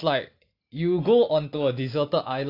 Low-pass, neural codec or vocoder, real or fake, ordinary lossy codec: 5.4 kHz; none; real; AAC, 32 kbps